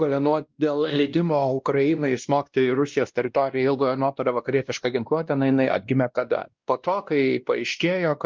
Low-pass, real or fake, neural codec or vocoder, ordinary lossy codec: 7.2 kHz; fake; codec, 16 kHz, 1 kbps, X-Codec, WavLM features, trained on Multilingual LibriSpeech; Opus, 24 kbps